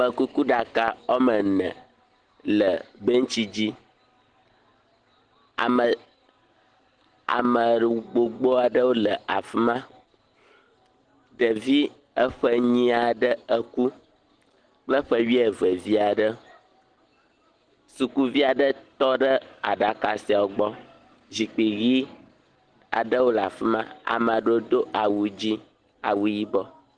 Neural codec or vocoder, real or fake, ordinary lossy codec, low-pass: none; real; Opus, 16 kbps; 9.9 kHz